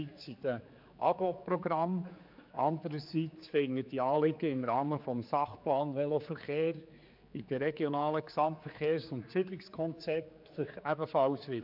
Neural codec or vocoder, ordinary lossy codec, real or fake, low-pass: codec, 16 kHz, 4 kbps, X-Codec, HuBERT features, trained on general audio; MP3, 48 kbps; fake; 5.4 kHz